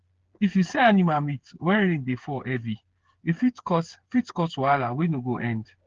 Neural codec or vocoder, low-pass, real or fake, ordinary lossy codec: codec, 16 kHz, 8 kbps, FreqCodec, smaller model; 7.2 kHz; fake; Opus, 16 kbps